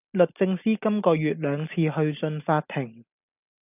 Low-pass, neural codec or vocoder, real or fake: 3.6 kHz; none; real